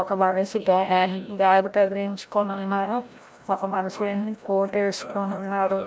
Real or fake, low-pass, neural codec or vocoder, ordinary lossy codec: fake; none; codec, 16 kHz, 0.5 kbps, FreqCodec, larger model; none